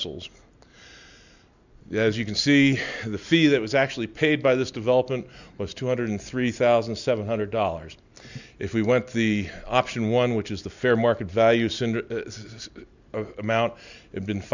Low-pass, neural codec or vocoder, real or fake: 7.2 kHz; none; real